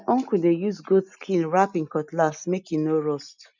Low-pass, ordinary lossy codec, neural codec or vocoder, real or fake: 7.2 kHz; none; none; real